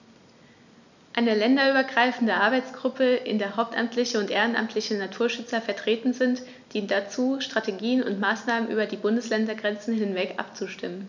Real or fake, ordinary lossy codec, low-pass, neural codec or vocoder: real; none; 7.2 kHz; none